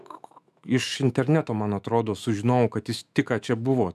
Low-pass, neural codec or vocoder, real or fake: 14.4 kHz; autoencoder, 48 kHz, 128 numbers a frame, DAC-VAE, trained on Japanese speech; fake